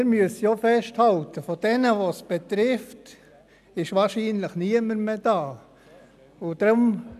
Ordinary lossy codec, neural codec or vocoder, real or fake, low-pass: none; none; real; 14.4 kHz